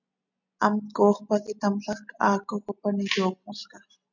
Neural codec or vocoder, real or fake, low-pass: none; real; 7.2 kHz